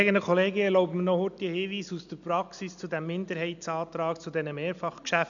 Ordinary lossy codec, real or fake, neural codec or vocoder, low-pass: none; real; none; 7.2 kHz